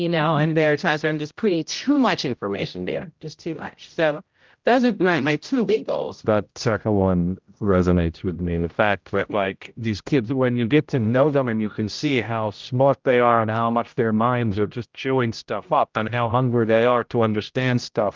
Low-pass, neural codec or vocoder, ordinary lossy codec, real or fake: 7.2 kHz; codec, 16 kHz, 0.5 kbps, X-Codec, HuBERT features, trained on general audio; Opus, 24 kbps; fake